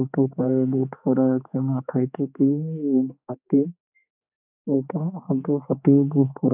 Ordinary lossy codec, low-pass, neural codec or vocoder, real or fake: none; 3.6 kHz; codec, 16 kHz, 4 kbps, X-Codec, HuBERT features, trained on general audio; fake